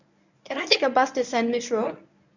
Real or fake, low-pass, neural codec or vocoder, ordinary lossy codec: fake; 7.2 kHz; codec, 24 kHz, 0.9 kbps, WavTokenizer, medium speech release version 1; none